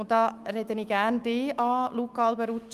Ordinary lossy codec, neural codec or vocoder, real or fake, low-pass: Opus, 32 kbps; autoencoder, 48 kHz, 128 numbers a frame, DAC-VAE, trained on Japanese speech; fake; 14.4 kHz